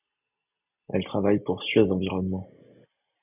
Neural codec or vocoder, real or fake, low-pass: none; real; 3.6 kHz